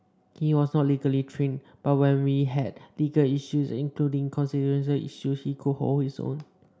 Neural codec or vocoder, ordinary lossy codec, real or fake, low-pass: none; none; real; none